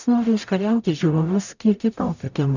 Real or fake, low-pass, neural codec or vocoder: fake; 7.2 kHz; codec, 44.1 kHz, 0.9 kbps, DAC